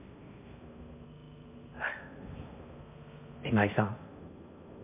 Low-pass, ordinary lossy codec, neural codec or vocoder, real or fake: 3.6 kHz; MP3, 32 kbps; codec, 16 kHz in and 24 kHz out, 0.6 kbps, FocalCodec, streaming, 4096 codes; fake